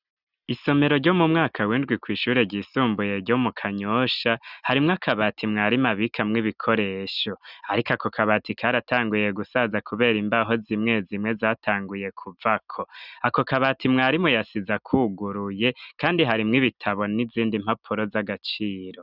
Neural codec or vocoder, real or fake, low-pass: none; real; 5.4 kHz